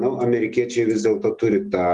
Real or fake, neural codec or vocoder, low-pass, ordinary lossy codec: real; none; 10.8 kHz; Opus, 32 kbps